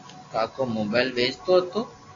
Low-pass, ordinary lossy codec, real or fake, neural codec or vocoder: 7.2 kHz; AAC, 32 kbps; real; none